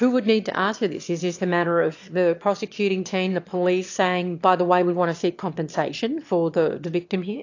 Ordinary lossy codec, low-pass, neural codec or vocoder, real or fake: AAC, 48 kbps; 7.2 kHz; autoencoder, 22.05 kHz, a latent of 192 numbers a frame, VITS, trained on one speaker; fake